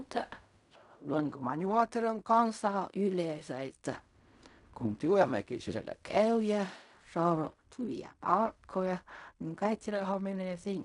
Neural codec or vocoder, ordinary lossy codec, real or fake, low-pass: codec, 16 kHz in and 24 kHz out, 0.4 kbps, LongCat-Audio-Codec, fine tuned four codebook decoder; none; fake; 10.8 kHz